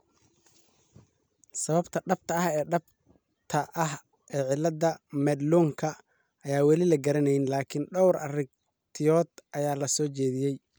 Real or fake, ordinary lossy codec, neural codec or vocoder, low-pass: real; none; none; none